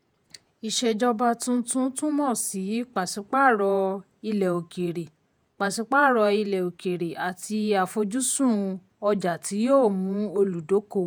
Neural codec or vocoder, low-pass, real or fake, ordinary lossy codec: vocoder, 48 kHz, 128 mel bands, Vocos; none; fake; none